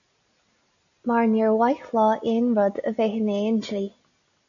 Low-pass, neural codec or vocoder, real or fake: 7.2 kHz; none; real